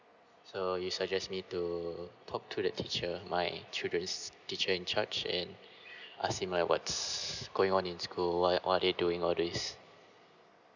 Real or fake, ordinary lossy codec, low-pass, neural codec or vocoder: real; none; 7.2 kHz; none